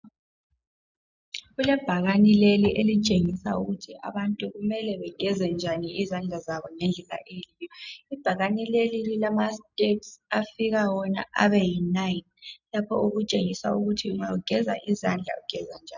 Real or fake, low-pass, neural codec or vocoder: real; 7.2 kHz; none